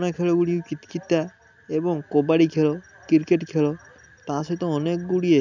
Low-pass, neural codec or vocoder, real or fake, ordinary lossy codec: 7.2 kHz; none; real; none